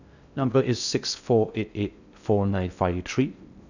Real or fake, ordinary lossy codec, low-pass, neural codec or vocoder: fake; none; 7.2 kHz; codec, 16 kHz in and 24 kHz out, 0.6 kbps, FocalCodec, streaming, 2048 codes